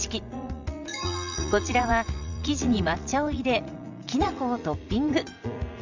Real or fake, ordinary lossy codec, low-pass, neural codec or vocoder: fake; none; 7.2 kHz; vocoder, 44.1 kHz, 80 mel bands, Vocos